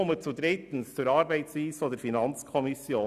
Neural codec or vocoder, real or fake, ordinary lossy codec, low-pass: none; real; none; 14.4 kHz